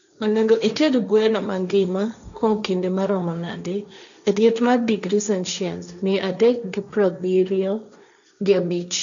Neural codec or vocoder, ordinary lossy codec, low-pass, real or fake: codec, 16 kHz, 1.1 kbps, Voila-Tokenizer; none; 7.2 kHz; fake